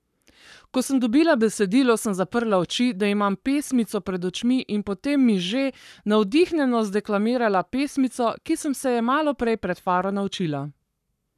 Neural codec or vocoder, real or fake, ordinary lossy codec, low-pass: codec, 44.1 kHz, 7.8 kbps, Pupu-Codec; fake; none; 14.4 kHz